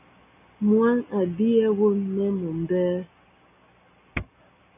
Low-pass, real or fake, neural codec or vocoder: 3.6 kHz; real; none